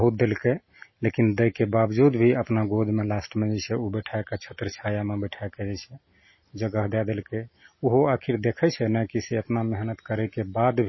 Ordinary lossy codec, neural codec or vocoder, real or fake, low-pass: MP3, 24 kbps; none; real; 7.2 kHz